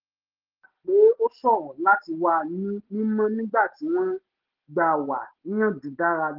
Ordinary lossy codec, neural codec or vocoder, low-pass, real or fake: Opus, 16 kbps; none; 5.4 kHz; real